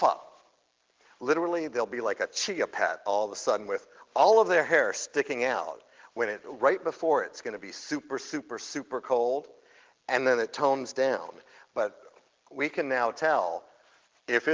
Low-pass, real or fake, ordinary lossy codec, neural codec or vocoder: 7.2 kHz; real; Opus, 16 kbps; none